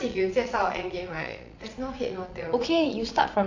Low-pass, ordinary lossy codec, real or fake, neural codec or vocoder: 7.2 kHz; AAC, 48 kbps; fake; vocoder, 22.05 kHz, 80 mel bands, Vocos